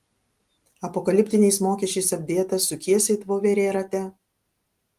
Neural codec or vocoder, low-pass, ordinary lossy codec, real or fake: none; 14.4 kHz; Opus, 24 kbps; real